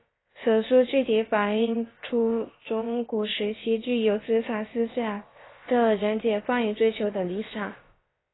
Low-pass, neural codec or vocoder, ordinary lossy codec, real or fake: 7.2 kHz; codec, 16 kHz, about 1 kbps, DyCAST, with the encoder's durations; AAC, 16 kbps; fake